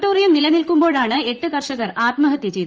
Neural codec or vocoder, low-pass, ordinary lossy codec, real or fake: vocoder, 44.1 kHz, 128 mel bands, Pupu-Vocoder; 7.2 kHz; Opus, 24 kbps; fake